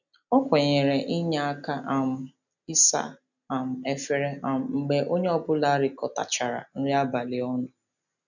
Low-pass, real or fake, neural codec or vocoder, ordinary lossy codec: 7.2 kHz; real; none; none